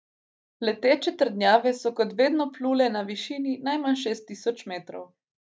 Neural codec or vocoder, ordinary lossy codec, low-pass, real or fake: none; none; none; real